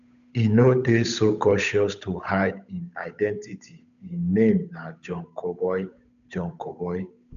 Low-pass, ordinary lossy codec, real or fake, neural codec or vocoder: 7.2 kHz; AAC, 96 kbps; fake; codec, 16 kHz, 8 kbps, FunCodec, trained on Chinese and English, 25 frames a second